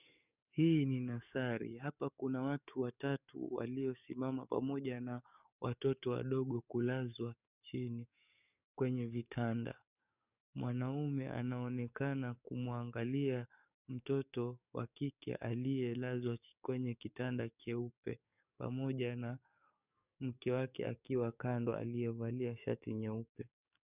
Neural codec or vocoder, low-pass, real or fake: codec, 44.1 kHz, 7.8 kbps, DAC; 3.6 kHz; fake